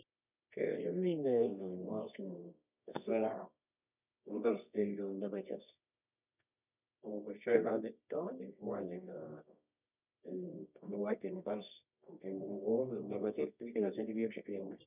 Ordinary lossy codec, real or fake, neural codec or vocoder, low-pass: none; fake; codec, 24 kHz, 0.9 kbps, WavTokenizer, medium music audio release; 3.6 kHz